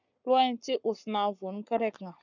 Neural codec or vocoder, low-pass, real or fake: codec, 44.1 kHz, 7.8 kbps, Pupu-Codec; 7.2 kHz; fake